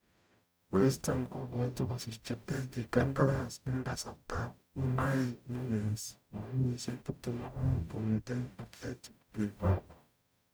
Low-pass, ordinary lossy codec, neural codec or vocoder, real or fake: none; none; codec, 44.1 kHz, 0.9 kbps, DAC; fake